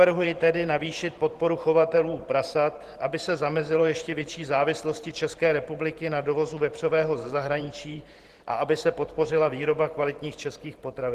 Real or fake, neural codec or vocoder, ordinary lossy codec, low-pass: fake; vocoder, 44.1 kHz, 128 mel bands every 512 samples, BigVGAN v2; Opus, 16 kbps; 14.4 kHz